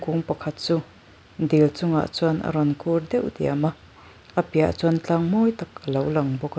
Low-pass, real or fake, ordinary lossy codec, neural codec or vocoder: none; real; none; none